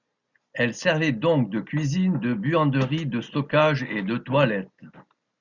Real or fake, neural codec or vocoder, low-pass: real; none; 7.2 kHz